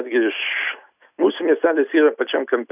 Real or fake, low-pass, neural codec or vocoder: real; 3.6 kHz; none